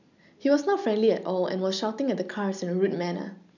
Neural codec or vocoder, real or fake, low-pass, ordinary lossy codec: none; real; 7.2 kHz; none